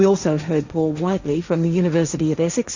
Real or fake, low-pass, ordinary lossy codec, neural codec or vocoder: fake; 7.2 kHz; Opus, 64 kbps; codec, 16 kHz, 1.1 kbps, Voila-Tokenizer